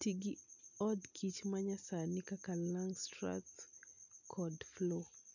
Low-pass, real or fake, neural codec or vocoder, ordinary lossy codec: 7.2 kHz; real; none; none